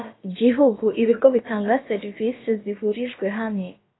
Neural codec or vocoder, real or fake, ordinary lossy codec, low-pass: codec, 16 kHz, about 1 kbps, DyCAST, with the encoder's durations; fake; AAC, 16 kbps; 7.2 kHz